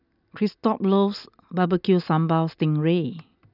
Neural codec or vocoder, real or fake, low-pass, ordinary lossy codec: none; real; 5.4 kHz; none